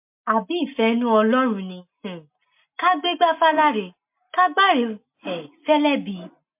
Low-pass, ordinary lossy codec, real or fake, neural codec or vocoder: 3.6 kHz; MP3, 32 kbps; real; none